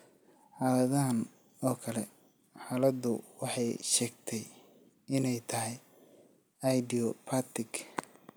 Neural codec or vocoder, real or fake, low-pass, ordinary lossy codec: none; real; none; none